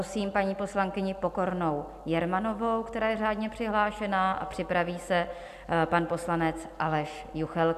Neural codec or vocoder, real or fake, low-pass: none; real; 14.4 kHz